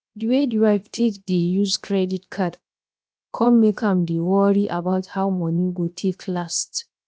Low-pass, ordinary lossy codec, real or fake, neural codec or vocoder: none; none; fake; codec, 16 kHz, 0.7 kbps, FocalCodec